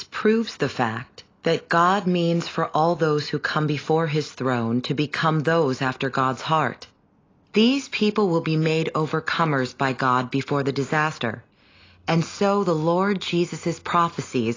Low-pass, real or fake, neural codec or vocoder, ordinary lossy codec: 7.2 kHz; real; none; AAC, 32 kbps